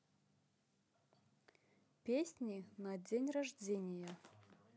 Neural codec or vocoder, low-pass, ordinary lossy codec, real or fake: none; none; none; real